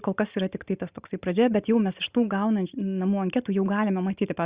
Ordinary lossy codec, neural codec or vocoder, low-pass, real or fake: Opus, 64 kbps; none; 3.6 kHz; real